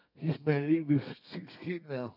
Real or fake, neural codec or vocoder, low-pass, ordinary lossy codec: fake; codec, 32 kHz, 1.9 kbps, SNAC; 5.4 kHz; none